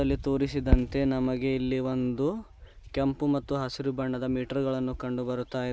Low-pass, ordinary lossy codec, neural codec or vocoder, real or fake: none; none; none; real